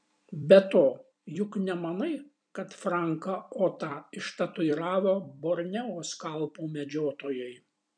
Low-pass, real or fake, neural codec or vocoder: 9.9 kHz; fake; vocoder, 44.1 kHz, 128 mel bands every 256 samples, BigVGAN v2